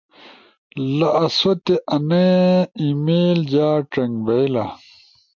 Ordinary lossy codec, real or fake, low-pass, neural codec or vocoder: MP3, 64 kbps; real; 7.2 kHz; none